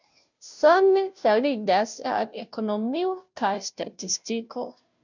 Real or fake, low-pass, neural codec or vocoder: fake; 7.2 kHz; codec, 16 kHz, 0.5 kbps, FunCodec, trained on Chinese and English, 25 frames a second